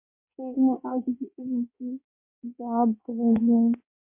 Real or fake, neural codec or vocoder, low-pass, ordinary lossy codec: fake; codec, 16 kHz in and 24 kHz out, 1.1 kbps, FireRedTTS-2 codec; 3.6 kHz; MP3, 32 kbps